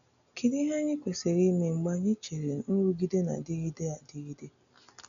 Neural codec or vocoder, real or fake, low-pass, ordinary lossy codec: none; real; 7.2 kHz; none